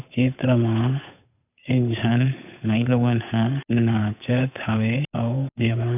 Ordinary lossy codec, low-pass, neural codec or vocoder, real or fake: none; 3.6 kHz; codec, 16 kHz, 8 kbps, FunCodec, trained on Chinese and English, 25 frames a second; fake